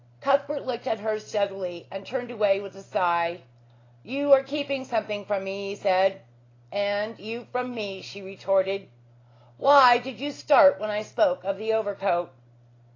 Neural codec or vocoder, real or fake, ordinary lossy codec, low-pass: none; real; AAC, 32 kbps; 7.2 kHz